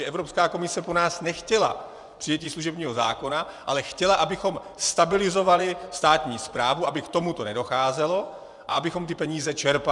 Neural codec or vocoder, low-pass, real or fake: vocoder, 24 kHz, 100 mel bands, Vocos; 10.8 kHz; fake